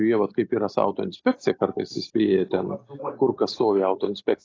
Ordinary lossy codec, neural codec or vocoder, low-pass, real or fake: AAC, 32 kbps; none; 7.2 kHz; real